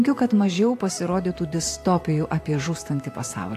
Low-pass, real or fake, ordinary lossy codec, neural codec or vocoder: 14.4 kHz; fake; AAC, 64 kbps; vocoder, 44.1 kHz, 128 mel bands every 512 samples, BigVGAN v2